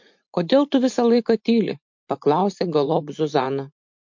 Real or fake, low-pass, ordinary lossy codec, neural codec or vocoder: fake; 7.2 kHz; MP3, 48 kbps; vocoder, 44.1 kHz, 128 mel bands every 512 samples, BigVGAN v2